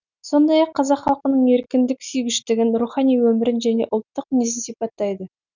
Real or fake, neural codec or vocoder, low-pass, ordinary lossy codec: real; none; 7.2 kHz; none